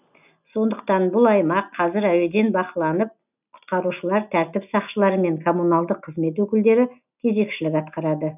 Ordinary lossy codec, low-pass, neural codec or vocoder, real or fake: none; 3.6 kHz; none; real